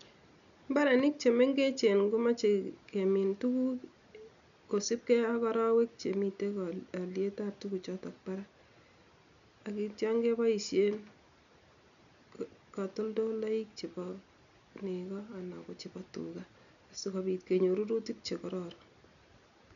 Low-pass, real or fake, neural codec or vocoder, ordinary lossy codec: 7.2 kHz; real; none; none